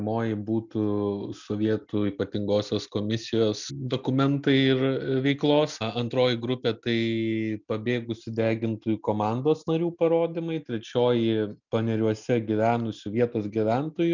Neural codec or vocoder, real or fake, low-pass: none; real; 7.2 kHz